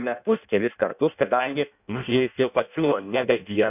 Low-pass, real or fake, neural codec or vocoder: 3.6 kHz; fake; codec, 16 kHz in and 24 kHz out, 0.6 kbps, FireRedTTS-2 codec